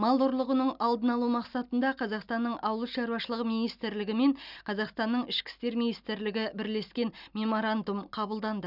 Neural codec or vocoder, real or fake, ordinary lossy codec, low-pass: none; real; none; 5.4 kHz